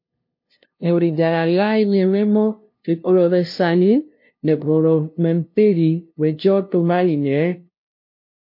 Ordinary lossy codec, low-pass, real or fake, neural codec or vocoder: MP3, 32 kbps; 5.4 kHz; fake; codec, 16 kHz, 0.5 kbps, FunCodec, trained on LibriTTS, 25 frames a second